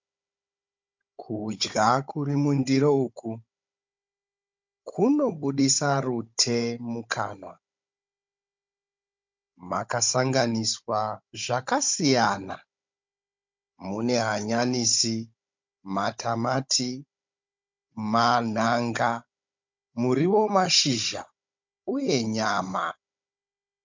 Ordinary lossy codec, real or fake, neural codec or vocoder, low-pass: MP3, 64 kbps; fake; codec, 16 kHz, 16 kbps, FunCodec, trained on Chinese and English, 50 frames a second; 7.2 kHz